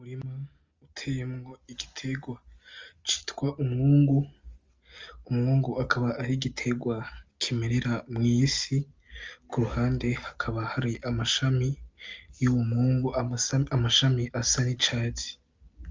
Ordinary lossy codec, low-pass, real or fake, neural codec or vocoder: Opus, 32 kbps; 7.2 kHz; real; none